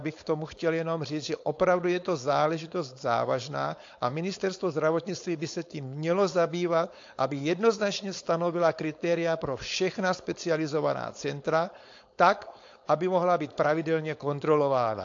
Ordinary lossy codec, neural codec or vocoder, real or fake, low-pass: AAC, 48 kbps; codec, 16 kHz, 4.8 kbps, FACodec; fake; 7.2 kHz